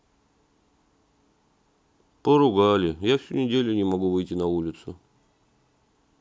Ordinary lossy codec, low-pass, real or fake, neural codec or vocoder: none; none; real; none